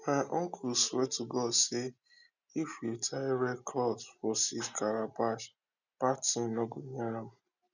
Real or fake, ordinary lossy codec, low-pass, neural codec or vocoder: real; none; 7.2 kHz; none